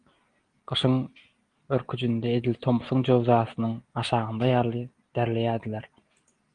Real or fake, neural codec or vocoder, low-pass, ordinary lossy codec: real; none; 9.9 kHz; Opus, 24 kbps